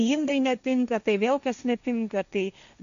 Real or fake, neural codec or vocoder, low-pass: fake; codec, 16 kHz, 1.1 kbps, Voila-Tokenizer; 7.2 kHz